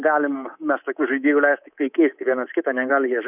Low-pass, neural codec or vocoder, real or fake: 3.6 kHz; codec, 24 kHz, 3.1 kbps, DualCodec; fake